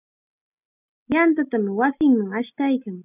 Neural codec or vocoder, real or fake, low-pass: none; real; 3.6 kHz